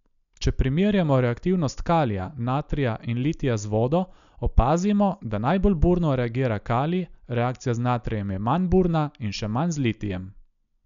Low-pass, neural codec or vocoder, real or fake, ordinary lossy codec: 7.2 kHz; none; real; none